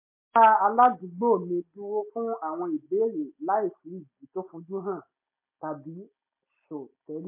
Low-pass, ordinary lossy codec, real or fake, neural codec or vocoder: 3.6 kHz; MP3, 24 kbps; real; none